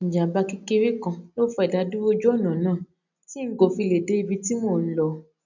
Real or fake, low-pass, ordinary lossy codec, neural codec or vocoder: real; 7.2 kHz; none; none